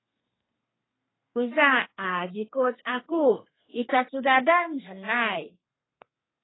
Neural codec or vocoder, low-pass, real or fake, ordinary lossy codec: codec, 32 kHz, 1.9 kbps, SNAC; 7.2 kHz; fake; AAC, 16 kbps